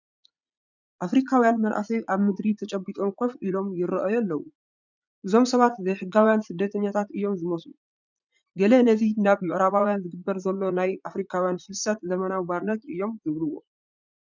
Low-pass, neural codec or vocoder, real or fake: 7.2 kHz; vocoder, 44.1 kHz, 80 mel bands, Vocos; fake